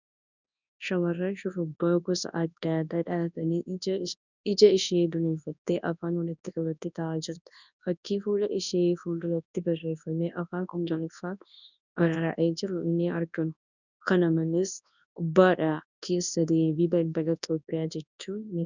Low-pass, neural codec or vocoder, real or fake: 7.2 kHz; codec, 24 kHz, 0.9 kbps, WavTokenizer, large speech release; fake